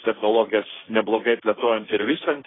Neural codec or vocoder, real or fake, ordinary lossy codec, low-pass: codec, 16 kHz, 1.1 kbps, Voila-Tokenizer; fake; AAC, 16 kbps; 7.2 kHz